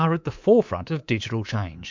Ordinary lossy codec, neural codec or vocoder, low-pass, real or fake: MP3, 64 kbps; codec, 24 kHz, 3.1 kbps, DualCodec; 7.2 kHz; fake